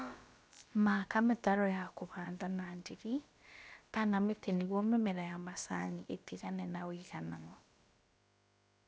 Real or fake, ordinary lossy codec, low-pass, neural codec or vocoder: fake; none; none; codec, 16 kHz, about 1 kbps, DyCAST, with the encoder's durations